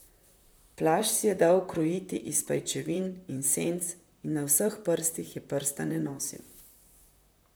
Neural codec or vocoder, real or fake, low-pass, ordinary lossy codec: vocoder, 44.1 kHz, 128 mel bands, Pupu-Vocoder; fake; none; none